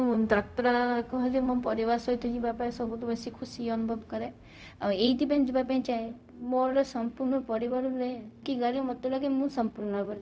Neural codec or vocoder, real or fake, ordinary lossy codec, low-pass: codec, 16 kHz, 0.4 kbps, LongCat-Audio-Codec; fake; none; none